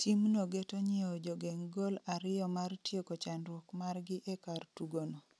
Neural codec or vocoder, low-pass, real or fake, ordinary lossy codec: none; none; real; none